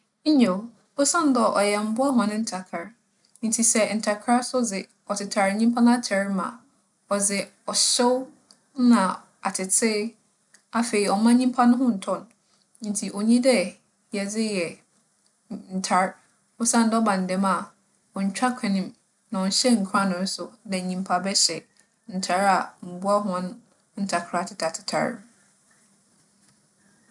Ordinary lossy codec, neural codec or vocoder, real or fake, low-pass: none; none; real; 10.8 kHz